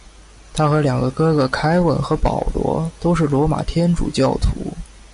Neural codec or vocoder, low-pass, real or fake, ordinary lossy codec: none; 10.8 kHz; real; AAC, 96 kbps